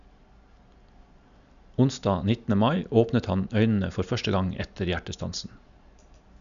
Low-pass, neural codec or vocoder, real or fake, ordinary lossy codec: 7.2 kHz; none; real; none